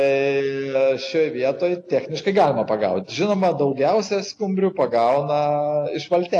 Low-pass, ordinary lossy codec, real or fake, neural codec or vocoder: 10.8 kHz; AAC, 48 kbps; real; none